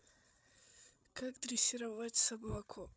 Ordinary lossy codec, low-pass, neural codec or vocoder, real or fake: none; none; codec, 16 kHz, 8 kbps, FreqCodec, larger model; fake